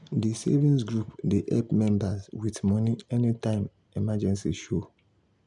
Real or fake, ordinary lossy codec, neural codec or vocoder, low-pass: real; none; none; 10.8 kHz